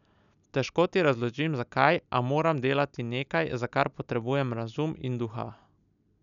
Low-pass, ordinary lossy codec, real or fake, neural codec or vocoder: 7.2 kHz; none; real; none